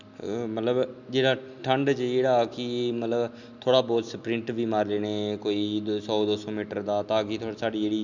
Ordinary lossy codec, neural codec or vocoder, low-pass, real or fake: none; none; 7.2 kHz; real